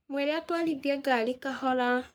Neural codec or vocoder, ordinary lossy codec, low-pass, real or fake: codec, 44.1 kHz, 3.4 kbps, Pupu-Codec; none; none; fake